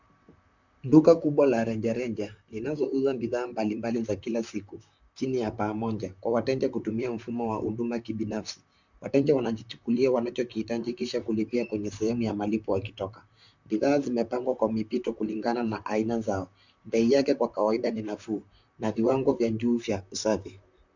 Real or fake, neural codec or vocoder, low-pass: fake; vocoder, 44.1 kHz, 128 mel bands, Pupu-Vocoder; 7.2 kHz